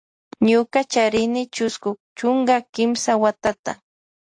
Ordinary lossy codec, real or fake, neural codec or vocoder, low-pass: AAC, 48 kbps; real; none; 9.9 kHz